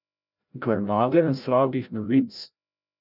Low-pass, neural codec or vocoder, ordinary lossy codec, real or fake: 5.4 kHz; codec, 16 kHz, 0.5 kbps, FreqCodec, larger model; none; fake